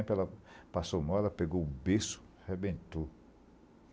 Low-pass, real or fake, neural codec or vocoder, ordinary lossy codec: none; real; none; none